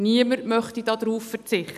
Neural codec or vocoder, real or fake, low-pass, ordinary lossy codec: none; real; 14.4 kHz; none